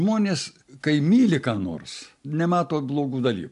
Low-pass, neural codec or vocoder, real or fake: 10.8 kHz; none; real